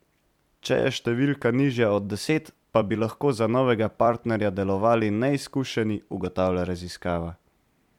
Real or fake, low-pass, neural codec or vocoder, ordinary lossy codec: fake; 19.8 kHz; vocoder, 44.1 kHz, 128 mel bands every 256 samples, BigVGAN v2; MP3, 96 kbps